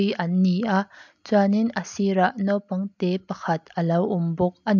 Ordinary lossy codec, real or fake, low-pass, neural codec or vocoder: MP3, 64 kbps; real; 7.2 kHz; none